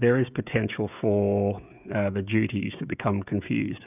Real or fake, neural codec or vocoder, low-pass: fake; codec, 16 kHz, 16 kbps, FreqCodec, smaller model; 3.6 kHz